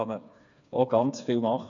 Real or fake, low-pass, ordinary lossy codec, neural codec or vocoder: fake; 7.2 kHz; none; codec, 16 kHz, 4 kbps, FreqCodec, smaller model